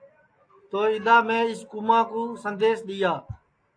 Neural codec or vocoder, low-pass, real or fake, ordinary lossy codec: none; 9.9 kHz; real; AAC, 48 kbps